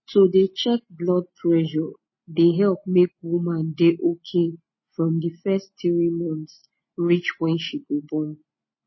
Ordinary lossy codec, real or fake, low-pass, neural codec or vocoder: MP3, 24 kbps; real; 7.2 kHz; none